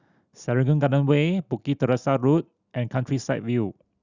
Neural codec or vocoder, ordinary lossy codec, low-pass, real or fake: none; Opus, 64 kbps; 7.2 kHz; real